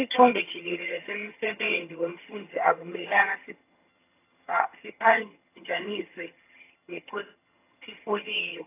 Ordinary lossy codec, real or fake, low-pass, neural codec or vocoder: AAC, 24 kbps; fake; 3.6 kHz; vocoder, 22.05 kHz, 80 mel bands, HiFi-GAN